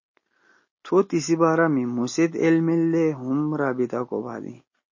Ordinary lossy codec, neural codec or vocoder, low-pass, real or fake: MP3, 32 kbps; none; 7.2 kHz; real